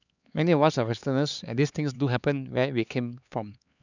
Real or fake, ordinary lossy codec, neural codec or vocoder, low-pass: fake; none; codec, 16 kHz, 4 kbps, X-Codec, HuBERT features, trained on LibriSpeech; 7.2 kHz